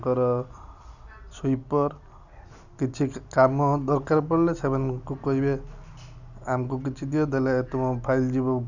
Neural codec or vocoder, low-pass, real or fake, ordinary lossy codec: none; 7.2 kHz; real; none